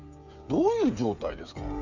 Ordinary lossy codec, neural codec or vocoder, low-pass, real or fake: none; codec, 16 kHz, 16 kbps, FreqCodec, smaller model; 7.2 kHz; fake